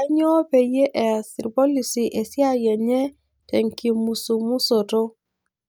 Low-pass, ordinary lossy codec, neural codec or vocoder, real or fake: none; none; none; real